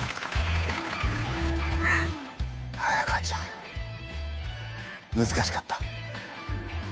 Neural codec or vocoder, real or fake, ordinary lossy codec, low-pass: codec, 16 kHz, 2 kbps, FunCodec, trained on Chinese and English, 25 frames a second; fake; none; none